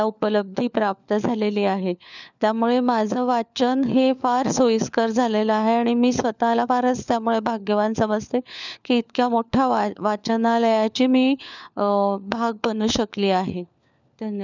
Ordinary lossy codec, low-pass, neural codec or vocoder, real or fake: none; 7.2 kHz; codec, 16 kHz, 4 kbps, FunCodec, trained on LibriTTS, 50 frames a second; fake